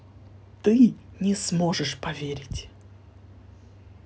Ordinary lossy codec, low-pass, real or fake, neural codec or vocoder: none; none; real; none